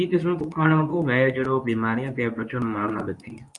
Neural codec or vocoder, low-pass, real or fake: codec, 24 kHz, 0.9 kbps, WavTokenizer, medium speech release version 2; 10.8 kHz; fake